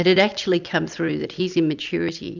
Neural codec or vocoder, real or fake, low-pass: none; real; 7.2 kHz